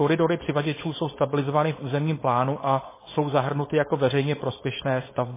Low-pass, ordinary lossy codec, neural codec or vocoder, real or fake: 3.6 kHz; MP3, 16 kbps; codec, 16 kHz, 4.8 kbps, FACodec; fake